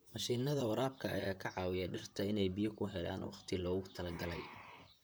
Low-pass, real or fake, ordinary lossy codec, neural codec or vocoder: none; fake; none; vocoder, 44.1 kHz, 128 mel bands, Pupu-Vocoder